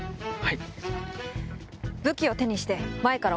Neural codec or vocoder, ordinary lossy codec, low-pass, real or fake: none; none; none; real